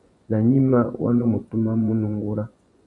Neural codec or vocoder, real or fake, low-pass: vocoder, 24 kHz, 100 mel bands, Vocos; fake; 10.8 kHz